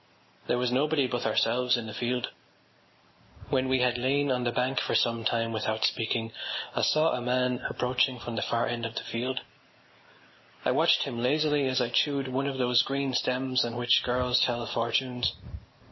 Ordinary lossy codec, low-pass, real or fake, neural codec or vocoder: MP3, 24 kbps; 7.2 kHz; real; none